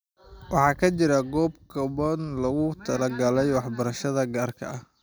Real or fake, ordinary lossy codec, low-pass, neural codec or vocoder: real; none; none; none